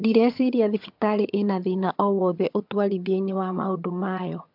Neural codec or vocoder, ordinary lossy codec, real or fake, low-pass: vocoder, 22.05 kHz, 80 mel bands, HiFi-GAN; MP3, 48 kbps; fake; 5.4 kHz